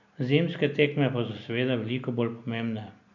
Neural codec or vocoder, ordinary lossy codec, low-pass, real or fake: none; none; 7.2 kHz; real